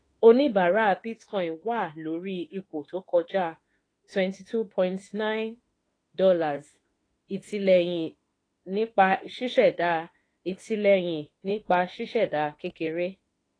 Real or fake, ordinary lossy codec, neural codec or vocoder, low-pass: fake; AAC, 32 kbps; autoencoder, 48 kHz, 32 numbers a frame, DAC-VAE, trained on Japanese speech; 9.9 kHz